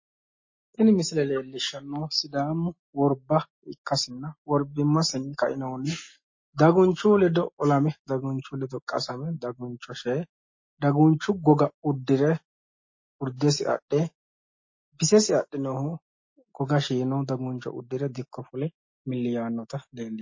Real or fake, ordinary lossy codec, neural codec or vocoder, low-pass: real; MP3, 32 kbps; none; 7.2 kHz